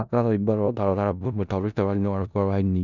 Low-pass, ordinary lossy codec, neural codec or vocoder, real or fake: 7.2 kHz; none; codec, 16 kHz in and 24 kHz out, 0.4 kbps, LongCat-Audio-Codec, four codebook decoder; fake